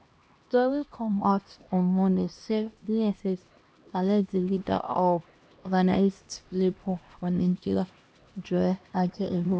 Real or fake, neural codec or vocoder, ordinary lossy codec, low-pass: fake; codec, 16 kHz, 1 kbps, X-Codec, HuBERT features, trained on LibriSpeech; none; none